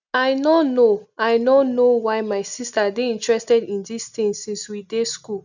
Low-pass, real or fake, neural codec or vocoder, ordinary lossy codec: 7.2 kHz; real; none; none